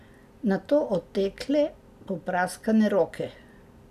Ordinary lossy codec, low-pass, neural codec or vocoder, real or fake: none; 14.4 kHz; none; real